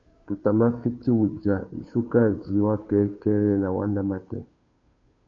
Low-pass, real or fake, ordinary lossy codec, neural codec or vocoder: 7.2 kHz; fake; MP3, 64 kbps; codec, 16 kHz, 2 kbps, FunCodec, trained on Chinese and English, 25 frames a second